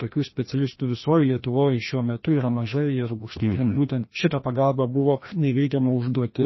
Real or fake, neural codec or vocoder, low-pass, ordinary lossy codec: fake; codec, 16 kHz, 1 kbps, FreqCodec, larger model; 7.2 kHz; MP3, 24 kbps